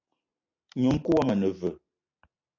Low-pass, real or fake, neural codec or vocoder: 7.2 kHz; real; none